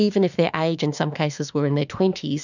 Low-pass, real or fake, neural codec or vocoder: 7.2 kHz; fake; autoencoder, 48 kHz, 32 numbers a frame, DAC-VAE, trained on Japanese speech